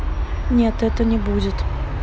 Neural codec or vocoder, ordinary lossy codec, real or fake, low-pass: none; none; real; none